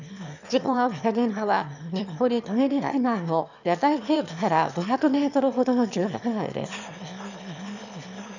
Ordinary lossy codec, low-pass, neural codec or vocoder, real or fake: none; 7.2 kHz; autoencoder, 22.05 kHz, a latent of 192 numbers a frame, VITS, trained on one speaker; fake